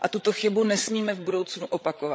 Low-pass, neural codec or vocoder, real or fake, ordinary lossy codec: none; codec, 16 kHz, 16 kbps, FreqCodec, larger model; fake; none